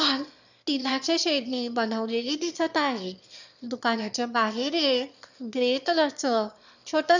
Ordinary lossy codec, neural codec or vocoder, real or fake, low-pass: none; autoencoder, 22.05 kHz, a latent of 192 numbers a frame, VITS, trained on one speaker; fake; 7.2 kHz